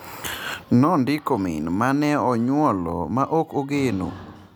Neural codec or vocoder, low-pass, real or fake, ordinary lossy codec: none; none; real; none